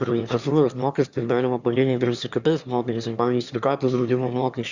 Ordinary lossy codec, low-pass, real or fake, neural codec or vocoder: Opus, 64 kbps; 7.2 kHz; fake; autoencoder, 22.05 kHz, a latent of 192 numbers a frame, VITS, trained on one speaker